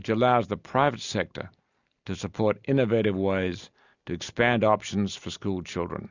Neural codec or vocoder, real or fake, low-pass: none; real; 7.2 kHz